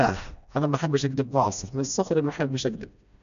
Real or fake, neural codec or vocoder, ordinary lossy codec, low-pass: fake; codec, 16 kHz, 1 kbps, FreqCodec, smaller model; none; 7.2 kHz